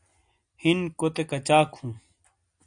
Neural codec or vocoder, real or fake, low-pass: none; real; 9.9 kHz